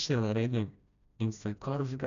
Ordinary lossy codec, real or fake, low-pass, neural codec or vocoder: none; fake; 7.2 kHz; codec, 16 kHz, 1 kbps, FreqCodec, smaller model